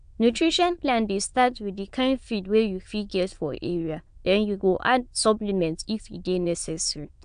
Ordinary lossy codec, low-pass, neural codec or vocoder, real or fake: AAC, 96 kbps; 9.9 kHz; autoencoder, 22.05 kHz, a latent of 192 numbers a frame, VITS, trained on many speakers; fake